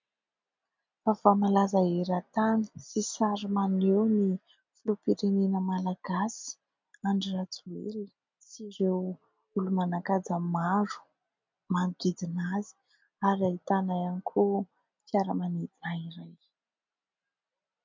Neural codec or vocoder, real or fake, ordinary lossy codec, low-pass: none; real; MP3, 48 kbps; 7.2 kHz